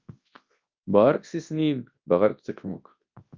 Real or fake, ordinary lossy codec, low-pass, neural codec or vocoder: fake; Opus, 24 kbps; 7.2 kHz; codec, 24 kHz, 0.9 kbps, WavTokenizer, large speech release